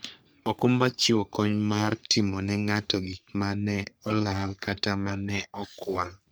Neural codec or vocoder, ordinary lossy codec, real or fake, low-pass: codec, 44.1 kHz, 3.4 kbps, Pupu-Codec; none; fake; none